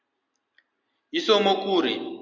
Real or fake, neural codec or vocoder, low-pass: real; none; 7.2 kHz